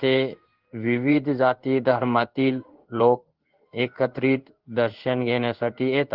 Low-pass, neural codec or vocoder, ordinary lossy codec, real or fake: 5.4 kHz; codec, 16 kHz in and 24 kHz out, 1 kbps, XY-Tokenizer; Opus, 16 kbps; fake